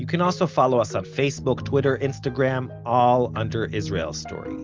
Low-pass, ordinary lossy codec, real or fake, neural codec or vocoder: 7.2 kHz; Opus, 24 kbps; real; none